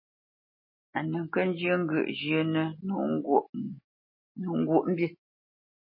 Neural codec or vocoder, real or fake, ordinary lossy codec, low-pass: none; real; MP3, 24 kbps; 5.4 kHz